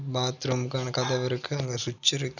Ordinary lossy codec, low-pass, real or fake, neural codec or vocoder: none; 7.2 kHz; real; none